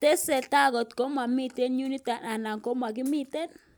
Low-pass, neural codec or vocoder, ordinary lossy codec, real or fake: none; none; none; real